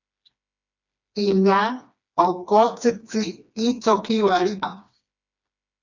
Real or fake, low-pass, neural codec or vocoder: fake; 7.2 kHz; codec, 16 kHz, 2 kbps, FreqCodec, smaller model